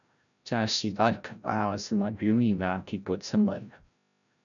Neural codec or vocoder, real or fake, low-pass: codec, 16 kHz, 0.5 kbps, FreqCodec, larger model; fake; 7.2 kHz